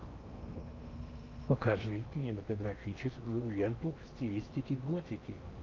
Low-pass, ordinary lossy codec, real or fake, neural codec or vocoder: 7.2 kHz; Opus, 24 kbps; fake; codec, 16 kHz in and 24 kHz out, 0.8 kbps, FocalCodec, streaming, 65536 codes